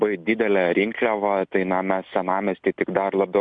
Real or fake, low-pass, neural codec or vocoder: real; 9.9 kHz; none